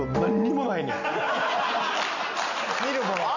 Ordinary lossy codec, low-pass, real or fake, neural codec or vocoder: none; 7.2 kHz; real; none